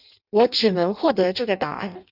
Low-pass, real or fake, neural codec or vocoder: 5.4 kHz; fake; codec, 16 kHz in and 24 kHz out, 0.6 kbps, FireRedTTS-2 codec